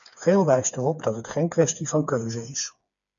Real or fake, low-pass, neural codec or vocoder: fake; 7.2 kHz; codec, 16 kHz, 4 kbps, FreqCodec, smaller model